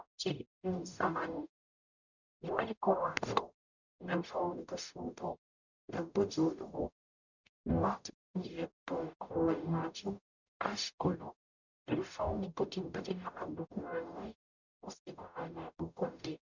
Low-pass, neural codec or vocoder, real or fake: 7.2 kHz; codec, 44.1 kHz, 0.9 kbps, DAC; fake